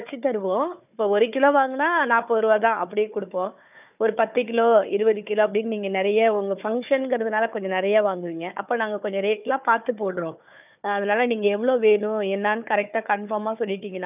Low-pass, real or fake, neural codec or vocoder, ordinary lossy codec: 3.6 kHz; fake; codec, 16 kHz, 4 kbps, FunCodec, trained on Chinese and English, 50 frames a second; none